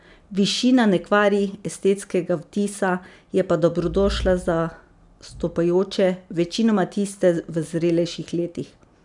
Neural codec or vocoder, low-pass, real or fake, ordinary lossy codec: none; 10.8 kHz; real; none